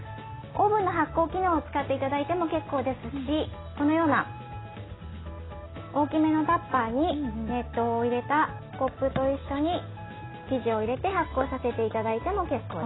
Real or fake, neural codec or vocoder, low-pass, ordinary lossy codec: real; none; 7.2 kHz; AAC, 16 kbps